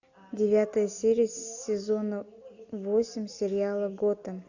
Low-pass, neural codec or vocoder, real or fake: 7.2 kHz; none; real